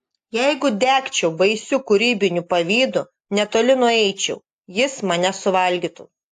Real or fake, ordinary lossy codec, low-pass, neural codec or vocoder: real; AAC, 64 kbps; 14.4 kHz; none